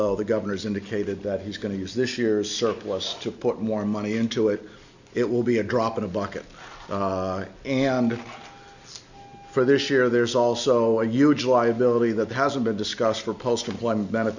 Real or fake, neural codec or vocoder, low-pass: real; none; 7.2 kHz